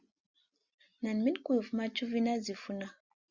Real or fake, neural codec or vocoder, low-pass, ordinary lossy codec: real; none; 7.2 kHz; Opus, 64 kbps